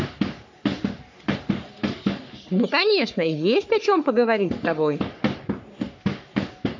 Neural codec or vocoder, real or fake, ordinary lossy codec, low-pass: codec, 44.1 kHz, 3.4 kbps, Pupu-Codec; fake; MP3, 64 kbps; 7.2 kHz